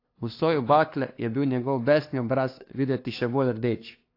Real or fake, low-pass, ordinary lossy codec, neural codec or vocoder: fake; 5.4 kHz; AAC, 32 kbps; codec, 16 kHz, 2 kbps, FunCodec, trained on LibriTTS, 25 frames a second